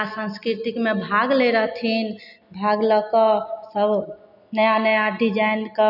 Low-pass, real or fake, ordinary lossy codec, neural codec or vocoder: 5.4 kHz; real; none; none